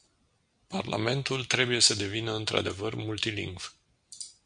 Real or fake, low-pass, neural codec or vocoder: real; 9.9 kHz; none